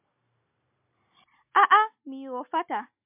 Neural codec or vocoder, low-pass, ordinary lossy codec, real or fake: none; 3.6 kHz; MP3, 32 kbps; real